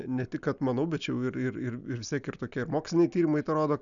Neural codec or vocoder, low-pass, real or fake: none; 7.2 kHz; real